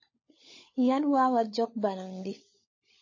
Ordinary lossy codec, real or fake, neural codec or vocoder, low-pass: MP3, 32 kbps; fake; codec, 16 kHz, 16 kbps, FunCodec, trained on LibriTTS, 50 frames a second; 7.2 kHz